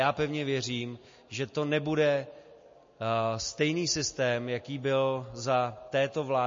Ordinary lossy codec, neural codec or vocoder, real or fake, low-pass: MP3, 32 kbps; none; real; 7.2 kHz